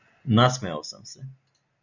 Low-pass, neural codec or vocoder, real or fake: 7.2 kHz; none; real